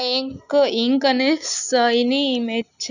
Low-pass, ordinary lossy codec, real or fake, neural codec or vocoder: 7.2 kHz; none; real; none